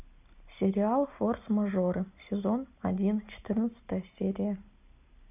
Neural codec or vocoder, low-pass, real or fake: none; 3.6 kHz; real